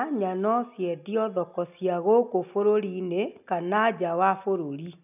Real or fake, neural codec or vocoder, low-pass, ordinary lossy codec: real; none; 3.6 kHz; none